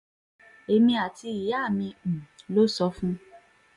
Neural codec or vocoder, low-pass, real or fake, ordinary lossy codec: none; 10.8 kHz; real; none